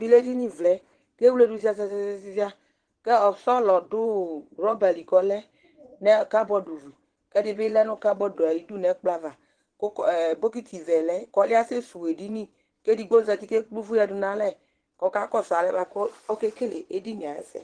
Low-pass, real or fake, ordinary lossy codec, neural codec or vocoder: 9.9 kHz; fake; Opus, 16 kbps; vocoder, 22.05 kHz, 80 mel bands, Vocos